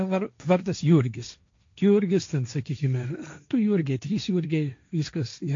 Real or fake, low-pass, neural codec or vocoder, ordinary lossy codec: fake; 7.2 kHz; codec, 16 kHz, 1.1 kbps, Voila-Tokenizer; AAC, 48 kbps